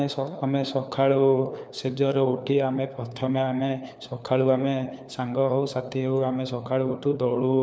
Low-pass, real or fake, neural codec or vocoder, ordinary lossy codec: none; fake; codec, 16 kHz, 4 kbps, FunCodec, trained on LibriTTS, 50 frames a second; none